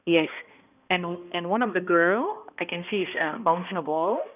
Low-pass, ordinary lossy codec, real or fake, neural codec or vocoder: 3.6 kHz; none; fake; codec, 16 kHz, 1 kbps, X-Codec, HuBERT features, trained on balanced general audio